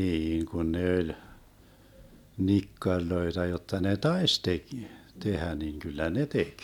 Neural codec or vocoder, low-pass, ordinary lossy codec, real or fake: none; 19.8 kHz; none; real